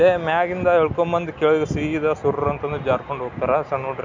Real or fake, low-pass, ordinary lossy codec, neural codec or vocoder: real; 7.2 kHz; MP3, 64 kbps; none